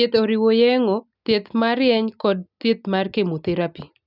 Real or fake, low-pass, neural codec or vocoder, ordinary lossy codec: real; 5.4 kHz; none; none